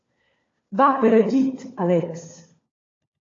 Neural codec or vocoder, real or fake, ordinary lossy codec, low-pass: codec, 16 kHz, 4 kbps, FunCodec, trained on LibriTTS, 50 frames a second; fake; AAC, 32 kbps; 7.2 kHz